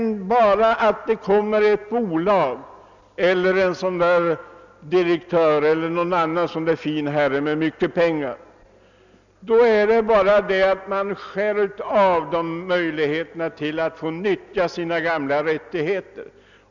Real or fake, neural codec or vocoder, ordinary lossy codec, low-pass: real; none; none; 7.2 kHz